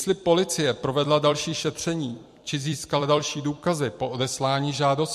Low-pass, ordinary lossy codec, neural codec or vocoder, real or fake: 14.4 kHz; MP3, 64 kbps; vocoder, 44.1 kHz, 128 mel bands every 256 samples, BigVGAN v2; fake